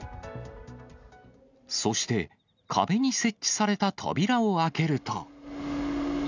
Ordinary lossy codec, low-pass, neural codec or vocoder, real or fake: none; 7.2 kHz; none; real